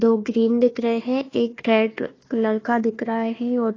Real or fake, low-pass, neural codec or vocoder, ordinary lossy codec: fake; 7.2 kHz; codec, 16 kHz, 1 kbps, FunCodec, trained on Chinese and English, 50 frames a second; AAC, 32 kbps